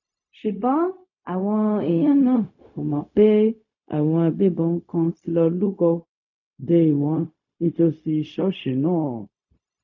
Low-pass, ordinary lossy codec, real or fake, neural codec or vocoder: 7.2 kHz; none; fake; codec, 16 kHz, 0.4 kbps, LongCat-Audio-Codec